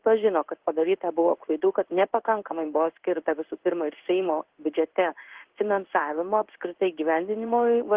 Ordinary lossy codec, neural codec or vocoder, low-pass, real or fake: Opus, 16 kbps; codec, 16 kHz in and 24 kHz out, 1 kbps, XY-Tokenizer; 3.6 kHz; fake